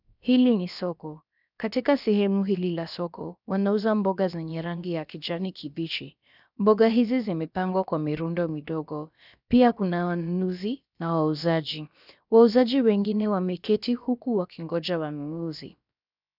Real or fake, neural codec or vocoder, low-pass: fake; codec, 16 kHz, about 1 kbps, DyCAST, with the encoder's durations; 5.4 kHz